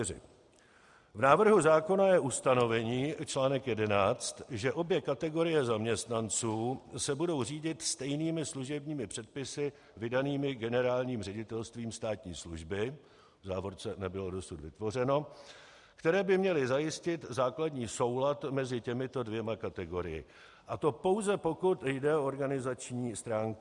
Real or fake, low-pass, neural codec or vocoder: real; 10.8 kHz; none